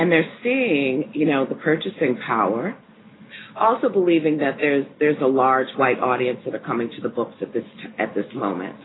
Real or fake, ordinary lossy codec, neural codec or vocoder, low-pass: real; AAC, 16 kbps; none; 7.2 kHz